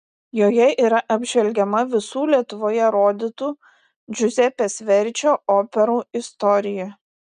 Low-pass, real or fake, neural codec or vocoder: 9.9 kHz; real; none